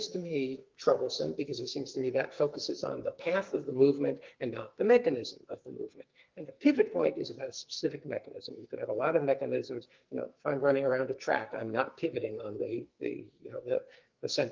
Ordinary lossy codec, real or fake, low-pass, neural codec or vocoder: Opus, 32 kbps; fake; 7.2 kHz; codec, 44.1 kHz, 2.6 kbps, SNAC